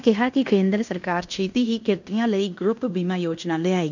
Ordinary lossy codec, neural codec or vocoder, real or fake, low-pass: none; codec, 16 kHz in and 24 kHz out, 0.9 kbps, LongCat-Audio-Codec, fine tuned four codebook decoder; fake; 7.2 kHz